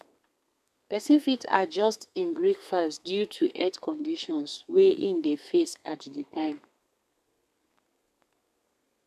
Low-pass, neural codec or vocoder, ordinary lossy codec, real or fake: 14.4 kHz; codec, 32 kHz, 1.9 kbps, SNAC; none; fake